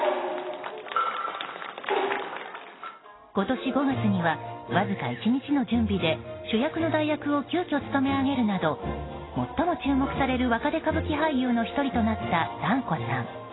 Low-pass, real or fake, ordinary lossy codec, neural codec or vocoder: 7.2 kHz; real; AAC, 16 kbps; none